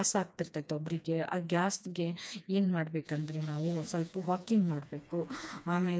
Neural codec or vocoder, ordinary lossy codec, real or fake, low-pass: codec, 16 kHz, 2 kbps, FreqCodec, smaller model; none; fake; none